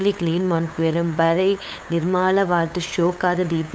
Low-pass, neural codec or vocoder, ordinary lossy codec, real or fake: none; codec, 16 kHz, 8 kbps, FunCodec, trained on LibriTTS, 25 frames a second; none; fake